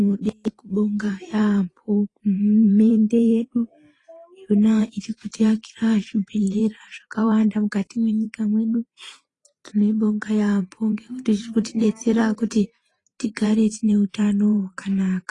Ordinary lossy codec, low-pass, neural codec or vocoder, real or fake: AAC, 32 kbps; 10.8 kHz; vocoder, 44.1 kHz, 128 mel bands every 512 samples, BigVGAN v2; fake